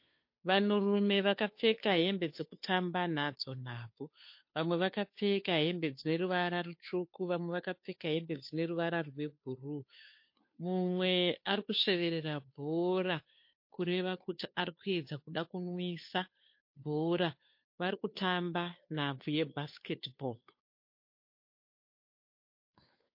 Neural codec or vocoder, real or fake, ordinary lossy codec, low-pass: codec, 16 kHz, 2 kbps, FunCodec, trained on Chinese and English, 25 frames a second; fake; MP3, 32 kbps; 5.4 kHz